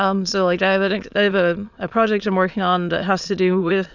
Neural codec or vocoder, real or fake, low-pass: autoencoder, 22.05 kHz, a latent of 192 numbers a frame, VITS, trained on many speakers; fake; 7.2 kHz